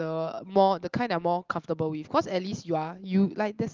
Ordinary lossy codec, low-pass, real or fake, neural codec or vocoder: Opus, 32 kbps; 7.2 kHz; real; none